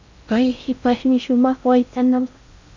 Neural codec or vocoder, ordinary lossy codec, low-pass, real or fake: codec, 16 kHz in and 24 kHz out, 0.6 kbps, FocalCodec, streaming, 4096 codes; MP3, 64 kbps; 7.2 kHz; fake